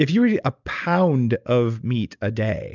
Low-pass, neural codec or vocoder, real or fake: 7.2 kHz; none; real